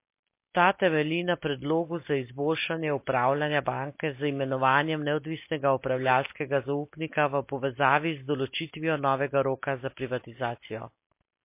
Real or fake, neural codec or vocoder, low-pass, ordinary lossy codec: real; none; 3.6 kHz; MP3, 24 kbps